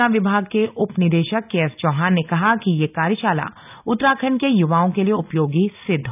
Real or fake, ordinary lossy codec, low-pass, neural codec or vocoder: real; none; 3.6 kHz; none